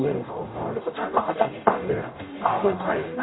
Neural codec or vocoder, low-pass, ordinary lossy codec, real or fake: codec, 44.1 kHz, 0.9 kbps, DAC; 7.2 kHz; AAC, 16 kbps; fake